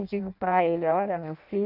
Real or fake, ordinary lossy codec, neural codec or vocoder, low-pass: fake; none; codec, 16 kHz in and 24 kHz out, 0.6 kbps, FireRedTTS-2 codec; 5.4 kHz